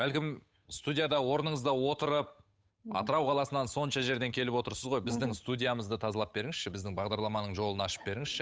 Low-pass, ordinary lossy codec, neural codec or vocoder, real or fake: none; none; codec, 16 kHz, 8 kbps, FunCodec, trained on Chinese and English, 25 frames a second; fake